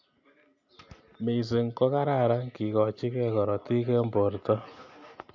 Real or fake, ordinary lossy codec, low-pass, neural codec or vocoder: real; MP3, 48 kbps; 7.2 kHz; none